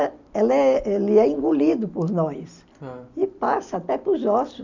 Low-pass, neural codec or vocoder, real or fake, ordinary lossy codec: 7.2 kHz; none; real; none